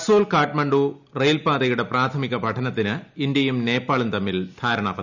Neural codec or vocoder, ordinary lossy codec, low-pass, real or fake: none; none; 7.2 kHz; real